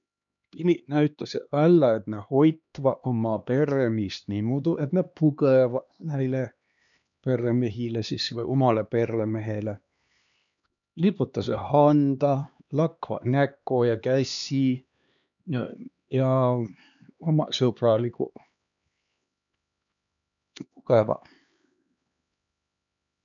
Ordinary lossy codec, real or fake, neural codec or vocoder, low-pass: none; fake; codec, 16 kHz, 2 kbps, X-Codec, HuBERT features, trained on LibriSpeech; 7.2 kHz